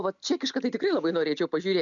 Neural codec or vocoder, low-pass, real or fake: none; 7.2 kHz; real